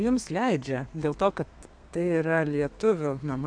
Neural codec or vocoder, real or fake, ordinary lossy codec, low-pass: codec, 32 kHz, 1.9 kbps, SNAC; fake; AAC, 64 kbps; 9.9 kHz